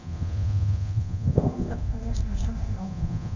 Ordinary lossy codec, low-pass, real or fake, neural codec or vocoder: none; 7.2 kHz; fake; codec, 24 kHz, 0.9 kbps, DualCodec